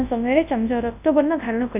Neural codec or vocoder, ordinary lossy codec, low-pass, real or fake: codec, 24 kHz, 0.9 kbps, WavTokenizer, large speech release; AAC, 32 kbps; 3.6 kHz; fake